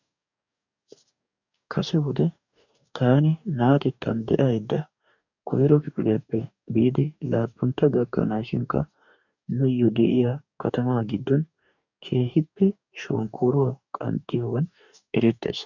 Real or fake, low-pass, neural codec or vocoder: fake; 7.2 kHz; codec, 44.1 kHz, 2.6 kbps, DAC